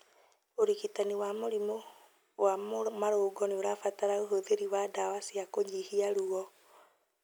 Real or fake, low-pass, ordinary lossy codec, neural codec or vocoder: real; none; none; none